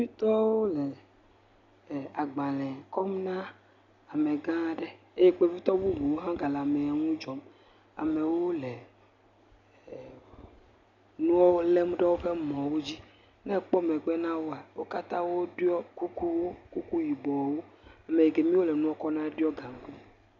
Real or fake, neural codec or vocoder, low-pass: real; none; 7.2 kHz